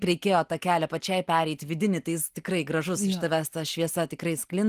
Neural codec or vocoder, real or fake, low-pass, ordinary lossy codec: none; real; 14.4 kHz; Opus, 24 kbps